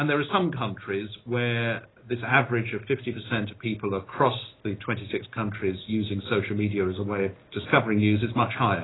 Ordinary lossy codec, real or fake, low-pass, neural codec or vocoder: AAC, 16 kbps; real; 7.2 kHz; none